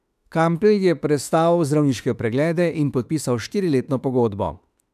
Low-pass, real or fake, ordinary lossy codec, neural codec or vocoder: 14.4 kHz; fake; none; autoencoder, 48 kHz, 32 numbers a frame, DAC-VAE, trained on Japanese speech